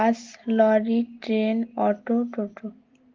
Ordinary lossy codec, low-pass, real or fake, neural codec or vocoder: Opus, 16 kbps; 7.2 kHz; real; none